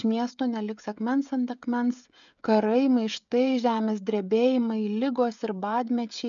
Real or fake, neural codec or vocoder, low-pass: fake; codec, 16 kHz, 16 kbps, FreqCodec, smaller model; 7.2 kHz